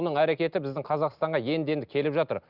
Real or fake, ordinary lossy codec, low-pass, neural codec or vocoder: real; Opus, 24 kbps; 5.4 kHz; none